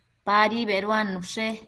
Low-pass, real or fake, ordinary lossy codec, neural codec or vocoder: 10.8 kHz; real; Opus, 16 kbps; none